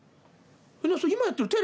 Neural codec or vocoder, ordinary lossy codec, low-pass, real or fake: none; none; none; real